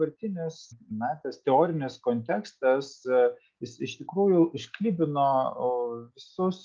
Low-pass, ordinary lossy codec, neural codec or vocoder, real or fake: 7.2 kHz; Opus, 24 kbps; none; real